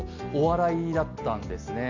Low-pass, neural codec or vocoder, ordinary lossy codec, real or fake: 7.2 kHz; none; none; real